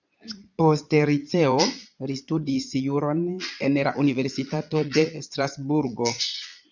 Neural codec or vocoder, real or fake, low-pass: vocoder, 22.05 kHz, 80 mel bands, Vocos; fake; 7.2 kHz